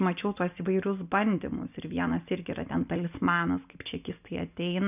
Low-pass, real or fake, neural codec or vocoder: 3.6 kHz; real; none